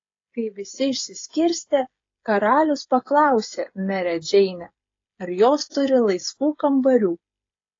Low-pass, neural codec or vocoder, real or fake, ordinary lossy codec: 7.2 kHz; codec, 16 kHz, 16 kbps, FreqCodec, smaller model; fake; AAC, 32 kbps